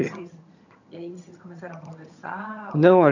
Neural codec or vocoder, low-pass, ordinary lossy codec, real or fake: vocoder, 22.05 kHz, 80 mel bands, HiFi-GAN; 7.2 kHz; none; fake